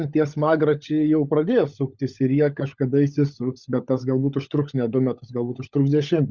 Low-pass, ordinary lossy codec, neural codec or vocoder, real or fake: 7.2 kHz; Opus, 64 kbps; codec, 16 kHz, 16 kbps, FunCodec, trained on LibriTTS, 50 frames a second; fake